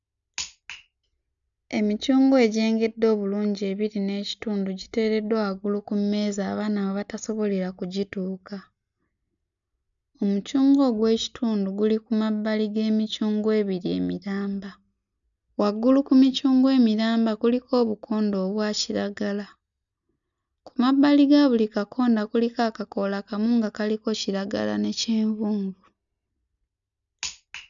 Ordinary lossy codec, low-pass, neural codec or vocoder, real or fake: none; 7.2 kHz; none; real